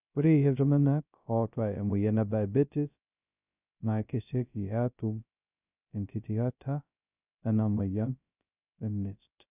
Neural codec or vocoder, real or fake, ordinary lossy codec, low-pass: codec, 16 kHz, 0.2 kbps, FocalCodec; fake; none; 3.6 kHz